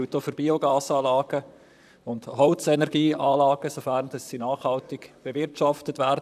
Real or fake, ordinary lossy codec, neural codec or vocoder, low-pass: fake; none; vocoder, 44.1 kHz, 128 mel bands, Pupu-Vocoder; 14.4 kHz